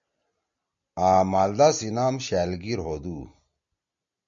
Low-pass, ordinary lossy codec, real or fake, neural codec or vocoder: 7.2 kHz; MP3, 64 kbps; real; none